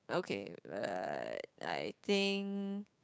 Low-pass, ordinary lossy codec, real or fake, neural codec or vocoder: none; none; fake; codec, 16 kHz, 6 kbps, DAC